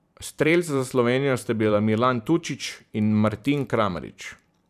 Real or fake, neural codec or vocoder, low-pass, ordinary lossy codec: fake; vocoder, 44.1 kHz, 128 mel bands every 512 samples, BigVGAN v2; 14.4 kHz; none